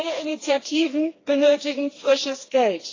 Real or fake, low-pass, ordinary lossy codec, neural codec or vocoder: fake; 7.2 kHz; AAC, 32 kbps; codec, 16 kHz, 2 kbps, FreqCodec, smaller model